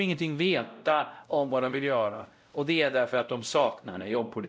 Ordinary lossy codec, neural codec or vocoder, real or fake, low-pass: none; codec, 16 kHz, 0.5 kbps, X-Codec, WavLM features, trained on Multilingual LibriSpeech; fake; none